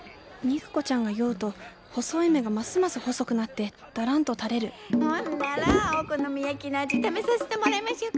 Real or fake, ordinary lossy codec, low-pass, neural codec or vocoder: real; none; none; none